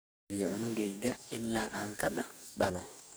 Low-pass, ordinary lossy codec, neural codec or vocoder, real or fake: none; none; codec, 44.1 kHz, 2.6 kbps, DAC; fake